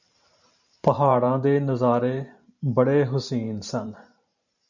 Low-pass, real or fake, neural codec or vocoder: 7.2 kHz; fake; vocoder, 44.1 kHz, 128 mel bands every 512 samples, BigVGAN v2